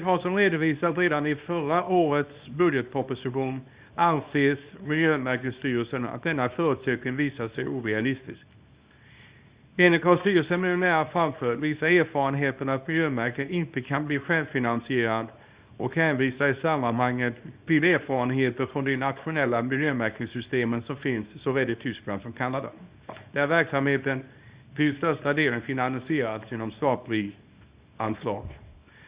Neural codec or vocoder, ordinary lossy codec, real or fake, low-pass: codec, 24 kHz, 0.9 kbps, WavTokenizer, small release; Opus, 64 kbps; fake; 3.6 kHz